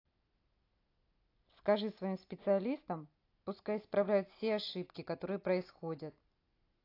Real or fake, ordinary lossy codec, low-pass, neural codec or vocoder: real; AAC, 32 kbps; 5.4 kHz; none